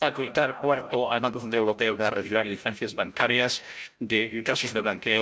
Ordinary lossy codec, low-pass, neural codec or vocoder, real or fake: none; none; codec, 16 kHz, 0.5 kbps, FreqCodec, larger model; fake